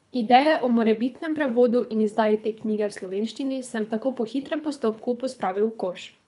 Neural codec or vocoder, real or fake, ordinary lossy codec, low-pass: codec, 24 kHz, 3 kbps, HILCodec; fake; none; 10.8 kHz